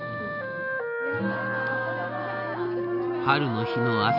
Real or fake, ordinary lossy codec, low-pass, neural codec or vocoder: real; none; 5.4 kHz; none